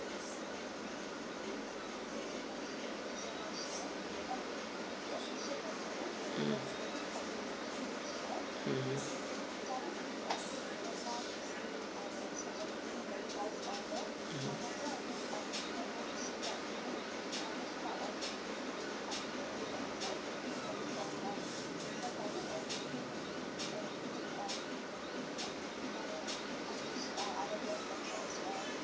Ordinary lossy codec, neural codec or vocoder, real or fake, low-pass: none; none; real; none